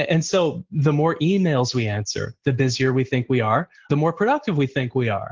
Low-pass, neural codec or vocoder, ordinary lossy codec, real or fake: 7.2 kHz; none; Opus, 16 kbps; real